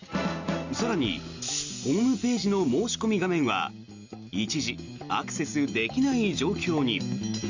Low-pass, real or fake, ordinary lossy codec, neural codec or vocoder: 7.2 kHz; real; Opus, 64 kbps; none